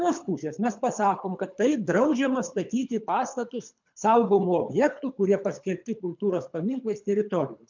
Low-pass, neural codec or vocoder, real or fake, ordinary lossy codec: 7.2 kHz; codec, 24 kHz, 3 kbps, HILCodec; fake; MP3, 64 kbps